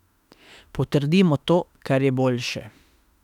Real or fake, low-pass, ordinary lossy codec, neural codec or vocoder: fake; 19.8 kHz; none; autoencoder, 48 kHz, 32 numbers a frame, DAC-VAE, trained on Japanese speech